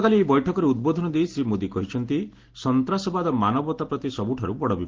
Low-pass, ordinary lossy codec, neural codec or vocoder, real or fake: 7.2 kHz; Opus, 16 kbps; none; real